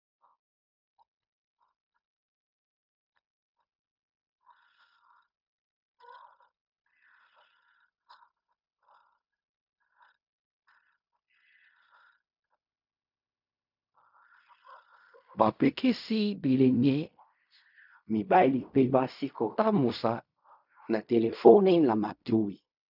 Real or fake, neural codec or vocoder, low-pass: fake; codec, 16 kHz in and 24 kHz out, 0.4 kbps, LongCat-Audio-Codec, fine tuned four codebook decoder; 5.4 kHz